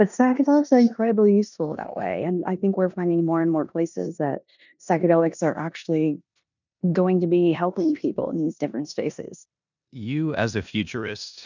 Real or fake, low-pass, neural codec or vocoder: fake; 7.2 kHz; codec, 16 kHz in and 24 kHz out, 0.9 kbps, LongCat-Audio-Codec, four codebook decoder